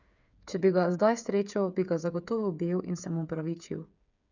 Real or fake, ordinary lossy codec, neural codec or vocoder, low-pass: fake; none; codec, 16 kHz, 8 kbps, FreqCodec, smaller model; 7.2 kHz